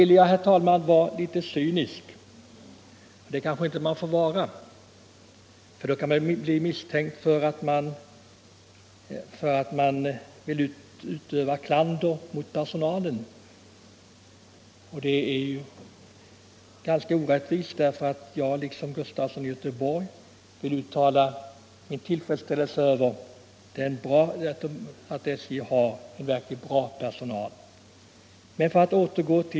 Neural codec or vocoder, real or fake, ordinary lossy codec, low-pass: none; real; none; none